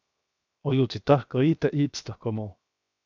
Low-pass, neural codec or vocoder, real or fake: 7.2 kHz; codec, 16 kHz, 0.7 kbps, FocalCodec; fake